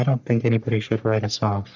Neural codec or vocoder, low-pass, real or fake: codec, 44.1 kHz, 3.4 kbps, Pupu-Codec; 7.2 kHz; fake